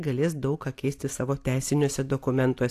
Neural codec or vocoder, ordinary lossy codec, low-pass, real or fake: none; AAC, 64 kbps; 14.4 kHz; real